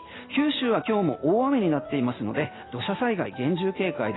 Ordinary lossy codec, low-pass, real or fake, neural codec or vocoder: AAC, 16 kbps; 7.2 kHz; real; none